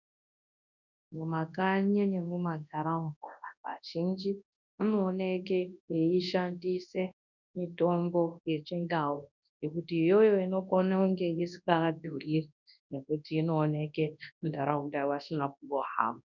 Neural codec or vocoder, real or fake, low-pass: codec, 24 kHz, 0.9 kbps, WavTokenizer, large speech release; fake; 7.2 kHz